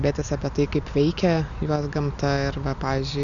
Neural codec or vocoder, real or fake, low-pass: none; real; 7.2 kHz